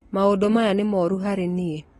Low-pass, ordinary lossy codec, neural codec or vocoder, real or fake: 19.8 kHz; AAC, 32 kbps; none; real